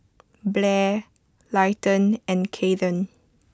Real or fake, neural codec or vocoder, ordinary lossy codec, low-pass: real; none; none; none